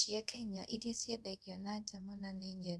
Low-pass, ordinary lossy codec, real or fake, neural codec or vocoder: none; none; fake; codec, 24 kHz, 0.5 kbps, DualCodec